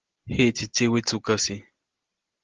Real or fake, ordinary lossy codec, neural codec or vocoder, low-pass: real; Opus, 16 kbps; none; 7.2 kHz